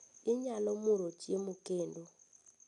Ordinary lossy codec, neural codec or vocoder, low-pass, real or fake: none; none; 10.8 kHz; real